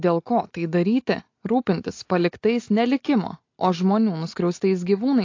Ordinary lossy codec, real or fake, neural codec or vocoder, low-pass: AAC, 48 kbps; real; none; 7.2 kHz